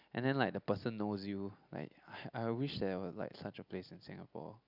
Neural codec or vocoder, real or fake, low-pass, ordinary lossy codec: none; real; 5.4 kHz; none